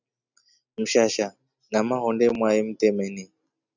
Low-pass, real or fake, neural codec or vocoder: 7.2 kHz; real; none